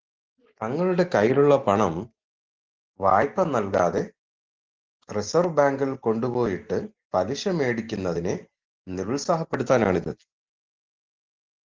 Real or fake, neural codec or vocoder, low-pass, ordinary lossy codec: real; none; 7.2 kHz; Opus, 16 kbps